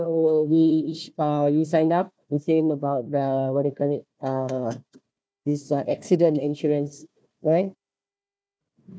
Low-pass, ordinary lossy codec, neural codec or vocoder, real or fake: none; none; codec, 16 kHz, 1 kbps, FunCodec, trained on Chinese and English, 50 frames a second; fake